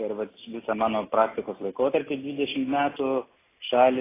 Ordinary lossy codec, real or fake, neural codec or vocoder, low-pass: AAC, 16 kbps; real; none; 3.6 kHz